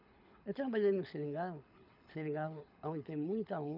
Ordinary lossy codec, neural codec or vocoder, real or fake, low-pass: none; codec, 24 kHz, 6 kbps, HILCodec; fake; 5.4 kHz